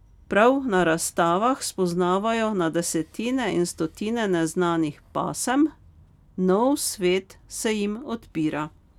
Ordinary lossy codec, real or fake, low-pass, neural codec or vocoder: none; real; 19.8 kHz; none